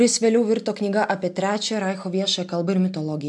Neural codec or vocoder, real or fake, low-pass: none; real; 10.8 kHz